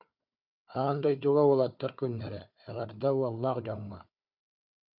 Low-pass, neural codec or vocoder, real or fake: 5.4 kHz; codec, 16 kHz, 4 kbps, FunCodec, trained on Chinese and English, 50 frames a second; fake